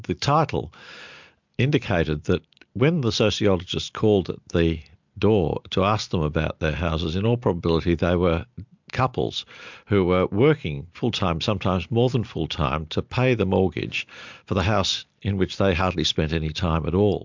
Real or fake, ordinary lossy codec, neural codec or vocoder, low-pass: real; MP3, 64 kbps; none; 7.2 kHz